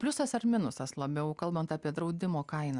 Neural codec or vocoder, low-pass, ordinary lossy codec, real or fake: none; 10.8 kHz; Opus, 64 kbps; real